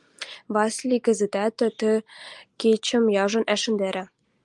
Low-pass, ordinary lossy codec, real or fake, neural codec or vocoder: 10.8 kHz; Opus, 32 kbps; real; none